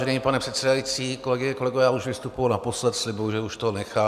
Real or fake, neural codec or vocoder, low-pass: real; none; 14.4 kHz